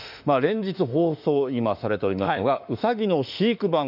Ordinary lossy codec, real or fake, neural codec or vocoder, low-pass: none; fake; autoencoder, 48 kHz, 32 numbers a frame, DAC-VAE, trained on Japanese speech; 5.4 kHz